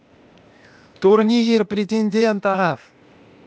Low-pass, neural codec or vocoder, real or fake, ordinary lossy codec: none; codec, 16 kHz, 0.8 kbps, ZipCodec; fake; none